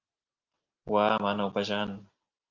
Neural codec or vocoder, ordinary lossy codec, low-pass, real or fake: none; Opus, 32 kbps; 7.2 kHz; real